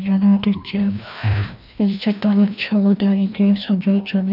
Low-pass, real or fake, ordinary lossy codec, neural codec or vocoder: 5.4 kHz; fake; none; codec, 16 kHz, 1 kbps, FreqCodec, larger model